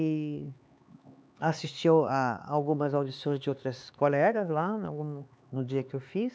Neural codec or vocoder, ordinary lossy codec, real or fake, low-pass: codec, 16 kHz, 4 kbps, X-Codec, HuBERT features, trained on LibriSpeech; none; fake; none